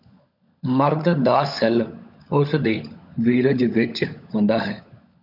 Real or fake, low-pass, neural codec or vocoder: fake; 5.4 kHz; codec, 16 kHz, 16 kbps, FunCodec, trained on LibriTTS, 50 frames a second